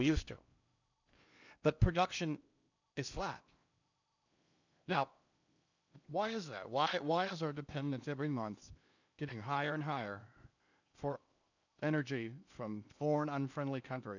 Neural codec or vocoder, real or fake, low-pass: codec, 16 kHz in and 24 kHz out, 0.8 kbps, FocalCodec, streaming, 65536 codes; fake; 7.2 kHz